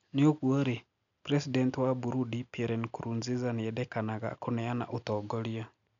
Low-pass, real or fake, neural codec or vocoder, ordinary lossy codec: 7.2 kHz; real; none; none